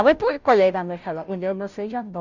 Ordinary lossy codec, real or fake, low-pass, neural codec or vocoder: none; fake; 7.2 kHz; codec, 16 kHz, 0.5 kbps, FunCodec, trained on Chinese and English, 25 frames a second